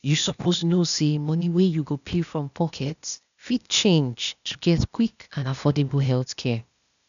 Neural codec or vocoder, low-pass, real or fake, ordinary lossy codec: codec, 16 kHz, 0.8 kbps, ZipCodec; 7.2 kHz; fake; none